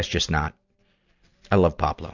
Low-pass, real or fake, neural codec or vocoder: 7.2 kHz; real; none